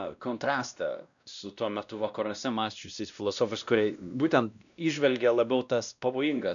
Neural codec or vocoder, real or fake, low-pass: codec, 16 kHz, 1 kbps, X-Codec, WavLM features, trained on Multilingual LibriSpeech; fake; 7.2 kHz